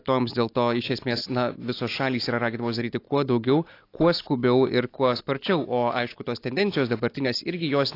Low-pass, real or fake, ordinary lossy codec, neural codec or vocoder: 5.4 kHz; real; AAC, 32 kbps; none